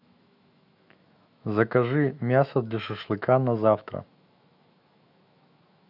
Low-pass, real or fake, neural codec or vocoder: 5.4 kHz; fake; autoencoder, 48 kHz, 128 numbers a frame, DAC-VAE, trained on Japanese speech